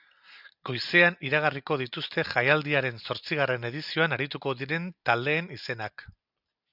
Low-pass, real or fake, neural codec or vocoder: 5.4 kHz; real; none